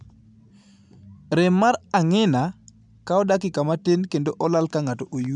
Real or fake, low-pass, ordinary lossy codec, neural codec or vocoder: real; 10.8 kHz; none; none